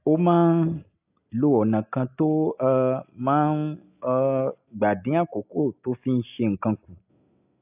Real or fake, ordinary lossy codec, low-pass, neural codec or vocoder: real; AAC, 32 kbps; 3.6 kHz; none